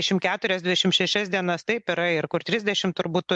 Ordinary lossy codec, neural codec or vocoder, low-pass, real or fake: MP3, 64 kbps; none; 10.8 kHz; real